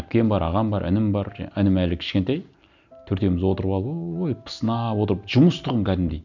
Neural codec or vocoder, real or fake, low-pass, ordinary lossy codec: none; real; 7.2 kHz; none